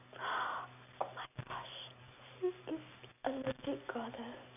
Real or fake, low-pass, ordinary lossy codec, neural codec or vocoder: real; 3.6 kHz; none; none